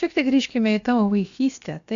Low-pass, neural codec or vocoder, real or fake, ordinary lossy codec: 7.2 kHz; codec, 16 kHz, about 1 kbps, DyCAST, with the encoder's durations; fake; MP3, 64 kbps